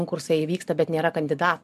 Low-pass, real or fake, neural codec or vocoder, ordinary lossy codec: 14.4 kHz; real; none; MP3, 96 kbps